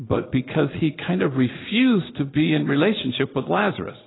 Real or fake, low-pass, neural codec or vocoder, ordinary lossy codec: fake; 7.2 kHz; vocoder, 22.05 kHz, 80 mel bands, Vocos; AAC, 16 kbps